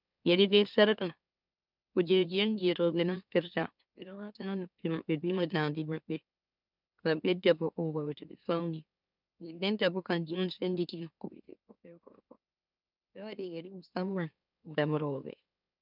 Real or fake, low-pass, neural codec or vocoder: fake; 5.4 kHz; autoencoder, 44.1 kHz, a latent of 192 numbers a frame, MeloTTS